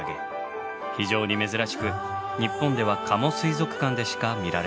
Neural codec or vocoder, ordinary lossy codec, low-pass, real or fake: none; none; none; real